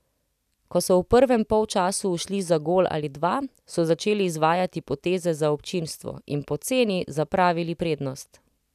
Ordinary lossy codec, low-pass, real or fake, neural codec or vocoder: none; 14.4 kHz; real; none